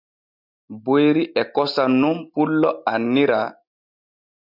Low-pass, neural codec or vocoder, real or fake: 5.4 kHz; none; real